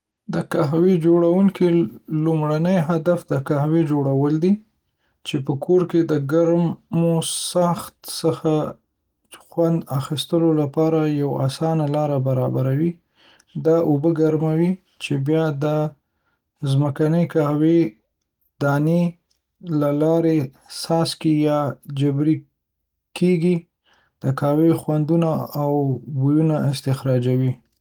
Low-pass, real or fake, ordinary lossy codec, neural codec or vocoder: 19.8 kHz; real; Opus, 24 kbps; none